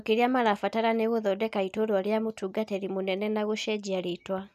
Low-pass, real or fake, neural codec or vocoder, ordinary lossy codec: 14.4 kHz; real; none; none